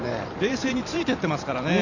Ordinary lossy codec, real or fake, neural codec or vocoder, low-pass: none; fake; vocoder, 44.1 kHz, 128 mel bands every 512 samples, BigVGAN v2; 7.2 kHz